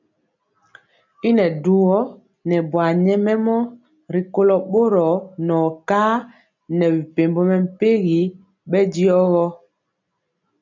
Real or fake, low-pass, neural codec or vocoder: real; 7.2 kHz; none